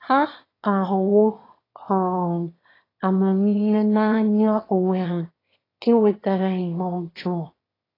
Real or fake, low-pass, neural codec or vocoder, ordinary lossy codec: fake; 5.4 kHz; autoencoder, 22.05 kHz, a latent of 192 numbers a frame, VITS, trained on one speaker; AAC, 24 kbps